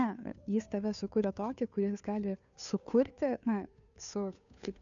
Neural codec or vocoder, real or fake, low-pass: codec, 16 kHz, 2 kbps, FunCodec, trained on Chinese and English, 25 frames a second; fake; 7.2 kHz